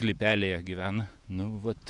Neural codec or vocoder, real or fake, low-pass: vocoder, 48 kHz, 128 mel bands, Vocos; fake; 10.8 kHz